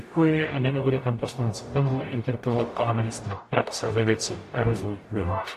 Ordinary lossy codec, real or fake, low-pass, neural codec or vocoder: AAC, 64 kbps; fake; 14.4 kHz; codec, 44.1 kHz, 0.9 kbps, DAC